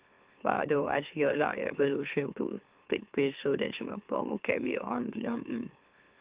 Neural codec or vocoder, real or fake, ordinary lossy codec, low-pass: autoencoder, 44.1 kHz, a latent of 192 numbers a frame, MeloTTS; fake; Opus, 32 kbps; 3.6 kHz